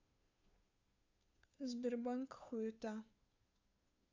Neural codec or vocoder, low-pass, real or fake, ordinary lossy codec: codec, 16 kHz, 2 kbps, FunCodec, trained on Chinese and English, 25 frames a second; 7.2 kHz; fake; AAC, 48 kbps